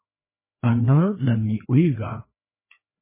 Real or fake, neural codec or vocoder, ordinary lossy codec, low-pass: fake; codec, 16 kHz, 2 kbps, FreqCodec, larger model; MP3, 16 kbps; 3.6 kHz